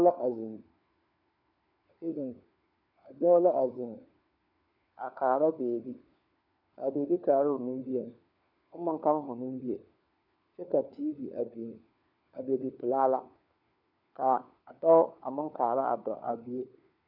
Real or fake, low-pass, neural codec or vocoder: fake; 5.4 kHz; codec, 16 kHz, 4 kbps, FunCodec, trained on LibriTTS, 50 frames a second